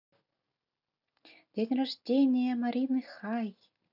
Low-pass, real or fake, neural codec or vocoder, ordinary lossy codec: 5.4 kHz; real; none; none